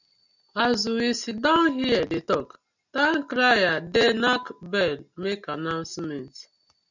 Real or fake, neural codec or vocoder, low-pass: real; none; 7.2 kHz